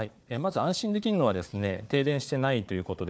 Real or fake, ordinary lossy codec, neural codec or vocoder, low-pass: fake; none; codec, 16 kHz, 4 kbps, FunCodec, trained on Chinese and English, 50 frames a second; none